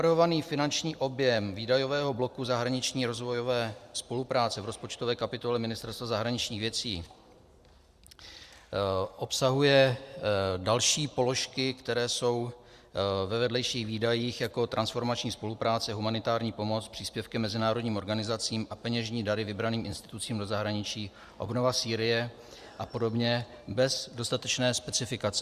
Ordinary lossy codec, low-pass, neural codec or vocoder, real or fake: Opus, 64 kbps; 14.4 kHz; vocoder, 44.1 kHz, 128 mel bands every 512 samples, BigVGAN v2; fake